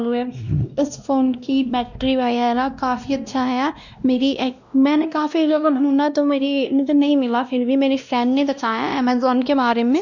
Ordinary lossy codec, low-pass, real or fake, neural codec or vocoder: none; 7.2 kHz; fake; codec, 16 kHz, 1 kbps, X-Codec, WavLM features, trained on Multilingual LibriSpeech